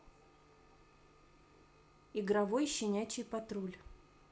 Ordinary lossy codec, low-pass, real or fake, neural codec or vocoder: none; none; real; none